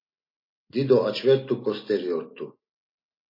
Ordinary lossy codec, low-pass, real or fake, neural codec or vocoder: MP3, 24 kbps; 5.4 kHz; real; none